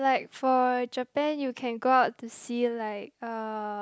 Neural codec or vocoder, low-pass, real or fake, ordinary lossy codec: none; none; real; none